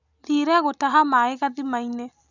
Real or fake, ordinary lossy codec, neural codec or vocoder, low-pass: real; none; none; 7.2 kHz